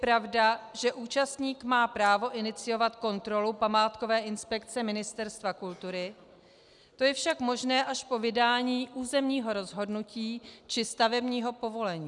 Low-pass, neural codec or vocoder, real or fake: 10.8 kHz; none; real